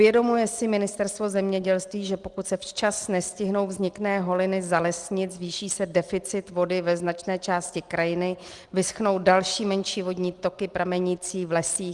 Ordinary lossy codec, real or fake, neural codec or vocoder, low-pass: Opus, 24 kbps; real; none; 10.8 kHz